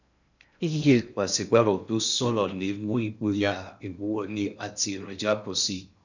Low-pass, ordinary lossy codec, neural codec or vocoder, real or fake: 7.2 kHz; none; codec, 16 kHz in and 24 kHz out, 0.6 kbps, FocalCodec, streaming, 2048 codes; fake